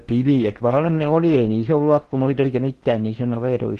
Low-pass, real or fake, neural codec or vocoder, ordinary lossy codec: 10.8 kHz; fake; codec, 16 kHz in and 24 kHz out, 0.8 kbps, FocalCodec, streaming, 65536 codes; Opus, 16 kbps